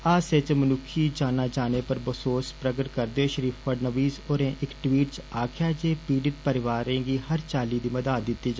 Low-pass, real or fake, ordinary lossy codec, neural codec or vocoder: none; real; none; none